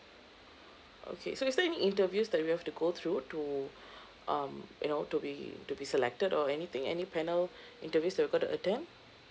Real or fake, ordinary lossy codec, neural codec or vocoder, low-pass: real; none; none; none